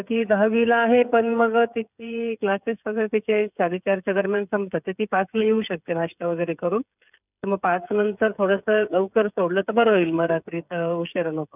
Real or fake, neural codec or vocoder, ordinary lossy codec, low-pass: fake; codec, 16 kHz, 16 kbps, FreqCodec, smaller model; none; 3.6 kHz